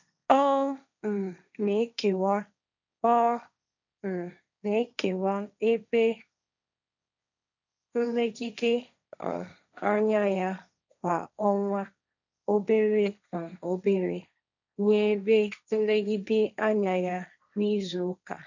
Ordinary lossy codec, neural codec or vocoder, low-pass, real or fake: none; codec, 16 kHz, 1.1 kbps, Voila-Tokenizer; 7.2 kHz; fake